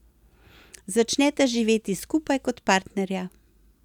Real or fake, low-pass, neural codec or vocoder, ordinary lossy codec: real; 19.8 kHz; none; none